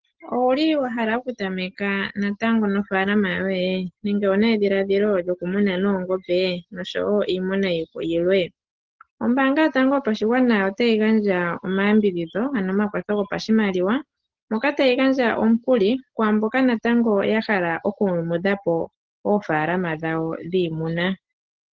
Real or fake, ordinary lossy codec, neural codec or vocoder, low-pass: real; Opus, 16 kbps; none; 7.2 kHz